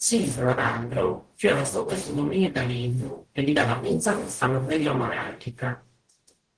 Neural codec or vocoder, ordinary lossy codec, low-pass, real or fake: codec, 44.1 kHz, 0.9 kbps, DAC; Opus, 16 kbps; 9.9 kHz; fake